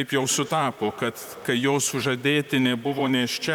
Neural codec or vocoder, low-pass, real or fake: vocoder, 44.1 kHz, 128 mel bands, Pupu-Vocoder; 19.8 kHz; fake